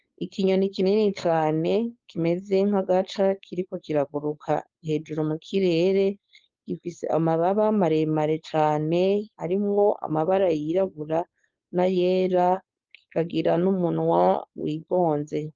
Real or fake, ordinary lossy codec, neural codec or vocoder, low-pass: fake; Opus, 24 kbps; codec, 16 kHz, 4.8 kbps, FACodec; 7.2 kHz